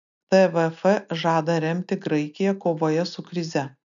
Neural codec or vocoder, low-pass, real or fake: none; 7.2 kHz; real